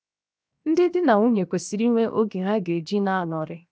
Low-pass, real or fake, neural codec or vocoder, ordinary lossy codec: none; fake; codec, 16 kHz, 0.7 kbps, FocalCodec; none